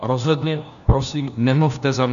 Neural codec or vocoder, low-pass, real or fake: codec, 16 kHz, 1 kbps, FunCodec, trained on LibriTTS, 50 frames a second; 7.2 kHz; fake